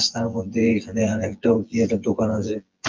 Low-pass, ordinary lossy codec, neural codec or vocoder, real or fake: 7.2 kHz; Opus, 24 kbps; vocoder, 24 kHz, 100 mel bands, Vocos; fake